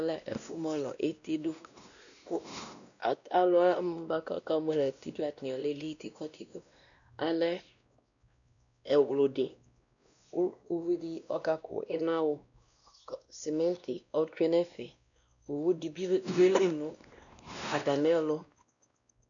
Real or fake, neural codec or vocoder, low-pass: fake; codec, 16 kHz, 1 kbps, X-Codec, WavLM features, trained on Multilingual LibriSpeech; 7.2 kHz